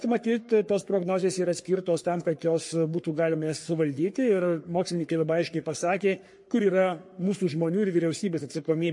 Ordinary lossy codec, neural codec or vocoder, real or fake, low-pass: MP3, 48 kbps; codec, 44.1 kHz, 3.4 kbps, Pupu-Codec; fake; 10.8 kHz